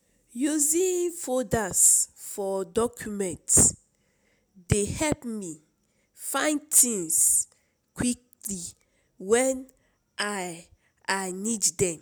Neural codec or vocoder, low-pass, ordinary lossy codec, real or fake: none; none; none; real